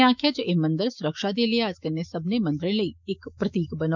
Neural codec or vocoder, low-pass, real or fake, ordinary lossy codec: autoencoder, 48 kHz, 128 numbers a frame, DAC-VAE, trained on Japanese speech; 7.2 kHz; fake; none